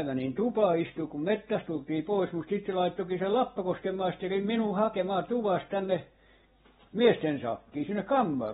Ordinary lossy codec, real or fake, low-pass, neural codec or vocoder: AAC, 16 kbps; real; 19.8 kHz; none